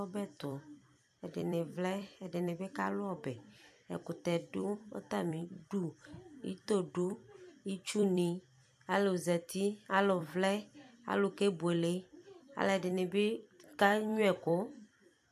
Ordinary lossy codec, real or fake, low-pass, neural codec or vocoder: AAC, 96 kbps; fake; 14.4 kHz; vocoder, 44.1 kHz, 128 mel bands every 256 samples, BigVGAN v2